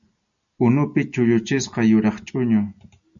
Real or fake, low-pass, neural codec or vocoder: real; 7.2 kHz; none